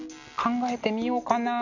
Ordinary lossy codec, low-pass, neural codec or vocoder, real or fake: none; 7.2 kHz; none; real